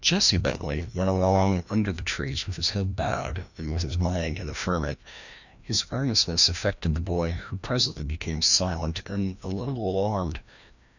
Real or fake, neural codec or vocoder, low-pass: fake; codec, 16 kHz, 1 kbps, FreqCodec, larger model; 7.2 kHz